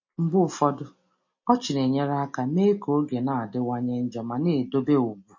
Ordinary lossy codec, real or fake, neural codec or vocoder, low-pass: MP3, 32 kbps; real; none; 7.2 kHz